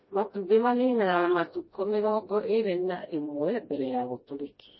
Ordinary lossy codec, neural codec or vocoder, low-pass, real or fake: MP3, 24 kbps; codec, 16 kHz, 1 kbps, FreqCodec, smaller model; 7.2 kHz; fake